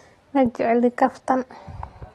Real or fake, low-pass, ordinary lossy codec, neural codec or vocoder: real; 19.8 kHz; AAC, 32 kbps; none